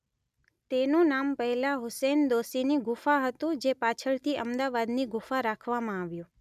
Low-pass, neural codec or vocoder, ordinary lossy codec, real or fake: 14.4 kHz; none; none; real